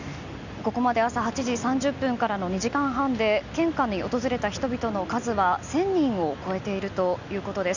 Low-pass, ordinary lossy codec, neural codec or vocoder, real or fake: 7.2 kHz; none; none; real